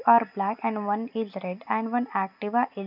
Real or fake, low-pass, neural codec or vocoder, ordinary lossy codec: real; 5.4 kHz; none; none